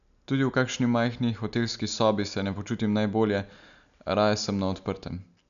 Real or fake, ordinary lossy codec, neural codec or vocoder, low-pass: real; none; none; 7.2 kHz